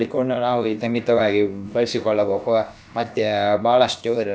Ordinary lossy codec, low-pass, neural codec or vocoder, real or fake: none; none; codec, 16 kHz, about 1 kbps, DyCAST, with the encoder's durations; fake